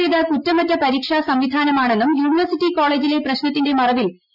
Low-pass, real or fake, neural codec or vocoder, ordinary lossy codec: 5.4 kHz; real; none; none